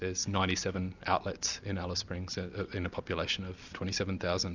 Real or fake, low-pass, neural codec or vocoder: real; 7.2 kHz; none